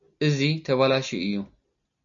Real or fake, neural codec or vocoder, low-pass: real; none; 7.2 kHz